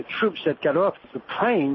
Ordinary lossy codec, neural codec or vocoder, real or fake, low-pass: MP3, 24 kbps; none; real; 7.2 kHz